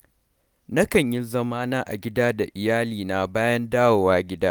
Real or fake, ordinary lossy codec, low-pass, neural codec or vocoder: real; none; none; none